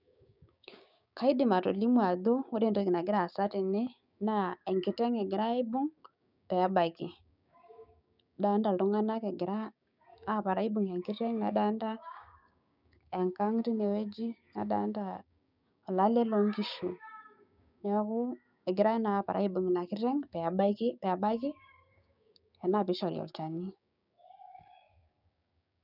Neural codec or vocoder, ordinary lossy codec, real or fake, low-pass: codec, 16 kHz, 6 kbps, DAC; none; fake; 5.4 kHz